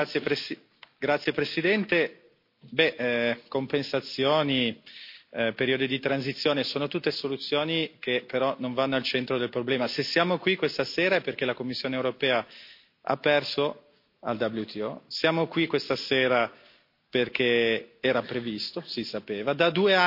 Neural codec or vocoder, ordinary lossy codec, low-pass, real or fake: none; MP3, 32 kbps; 5.4 kHz; real